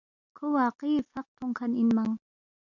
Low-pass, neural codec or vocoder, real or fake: 7.2 kHz; none; real